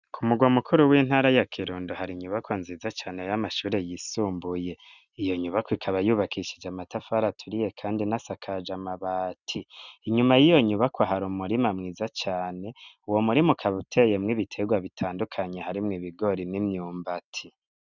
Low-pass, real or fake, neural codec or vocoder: 7.2 kHz; real; none